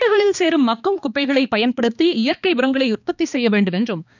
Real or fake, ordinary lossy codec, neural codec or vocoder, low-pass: fake; none; codec, 16 kHz, 2 kbps, X-Codec, HuBERT features, trained on balanced general audio; 7.2 kHz